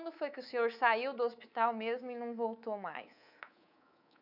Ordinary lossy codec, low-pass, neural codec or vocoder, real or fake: none; 5.4 kHz; codec, 24 kHz, 3.1 kbps, DualCodec; fake